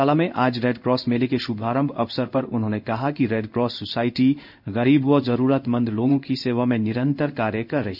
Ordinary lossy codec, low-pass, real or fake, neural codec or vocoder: none; 5.4 kHz; fake; codec, 16 kHz in and 24 kHz out, 1 kbps, XY-Tokenizer